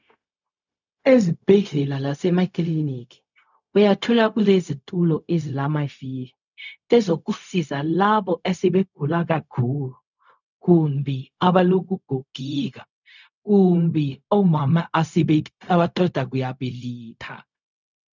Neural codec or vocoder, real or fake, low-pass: codec, 16 kHz, 0.4 kbps, LongCat-Audio-Codec; fake; 7.2 kHz